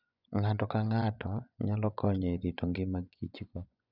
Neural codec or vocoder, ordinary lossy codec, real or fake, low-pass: vocoder, 24 kHz, 100 mel bands, Vocos; none; fake; 5.4 kHz